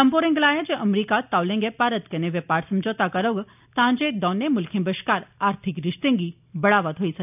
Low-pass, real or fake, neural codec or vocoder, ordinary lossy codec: 3.6 kHz; real; none; none